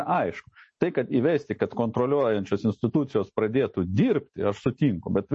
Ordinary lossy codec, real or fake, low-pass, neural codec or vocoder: MP3, 32 kbps; real; 10.8 kHz; none